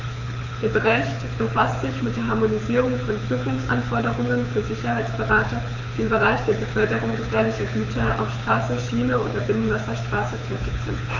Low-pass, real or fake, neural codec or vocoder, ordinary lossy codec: 7.2 kHz; fake; codec, 24 kHz, 6 kbps, HILCodec; none